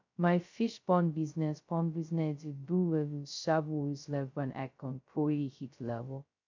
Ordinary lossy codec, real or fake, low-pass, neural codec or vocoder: MP3, 64 kbps; fake; 7.2 kHz; codec, 16 kHz, 0.2 kbps, FocalCodec